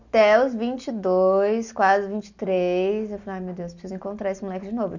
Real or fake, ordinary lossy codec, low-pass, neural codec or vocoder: real; none; 7.2 kHz; none